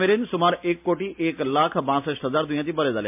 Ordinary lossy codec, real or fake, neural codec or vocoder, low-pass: MP3, 32 kbps; real; none; 3.6 kHz